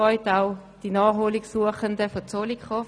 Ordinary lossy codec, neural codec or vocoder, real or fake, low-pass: none; none; real; none